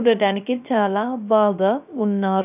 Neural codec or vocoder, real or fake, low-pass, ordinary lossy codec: codec, 16 kHz, 0.3 kbps, FocalCodec; fake; 3.6 kHz; none